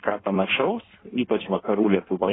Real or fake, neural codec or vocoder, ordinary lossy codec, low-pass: fake; codec, 16 kHz in and 24 kHz out, 1.1 kbps, FireRedTTS-2 codec; AAC, 16 kbps; 7.2 kHz